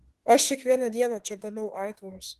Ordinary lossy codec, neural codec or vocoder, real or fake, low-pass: Opus, 16 kbps; autoencoder, 48 kHz, 32 numbers a frame, DAC-VAE, trained on Japanese speech; fake; 14.4 kHz